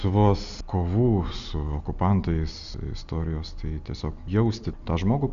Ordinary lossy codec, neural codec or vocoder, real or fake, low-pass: Opus, 64 kbps; none; real; 7.2 kHz